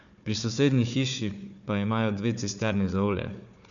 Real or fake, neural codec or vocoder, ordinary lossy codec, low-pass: fake; codec, 16 kHz, 4 kbps, FunCodec, trained on Chinese and English, 50 frames a second; none; 7.2 kHz